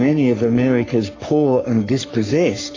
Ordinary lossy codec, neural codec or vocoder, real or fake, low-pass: AAC, 32 kbps; codec, 44.1 kHz, 3.4 kbps, Pupu-Codec; fake; 7.2 kHz